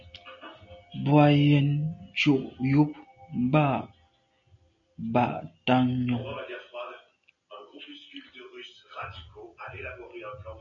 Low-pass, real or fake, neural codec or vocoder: 7.2 kHz; real; none